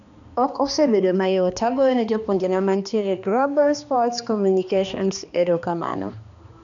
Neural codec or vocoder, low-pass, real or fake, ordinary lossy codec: codec, 16 kHz, 2 kbps, X-Codec, HuBERT features, trained on balanced general audio; 7.2 kHz; fake; none